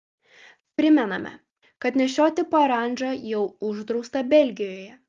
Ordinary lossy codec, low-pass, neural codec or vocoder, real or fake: Opus, 32 kbps; 7.2 kHz; none; real